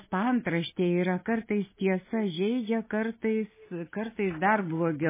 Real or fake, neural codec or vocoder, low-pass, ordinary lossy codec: real; none; 3.6 kHz; MP3, 16 kbps